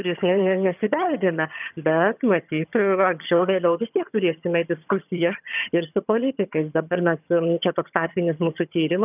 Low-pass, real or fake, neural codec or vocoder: 3.6 kHz; fake; vocoder, 22.05 kHz, 80 mel bands, HiFi-GAN